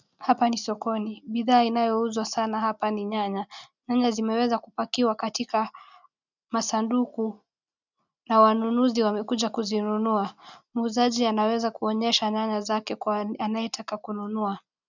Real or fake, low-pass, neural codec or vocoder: real; 7.2 kHz; none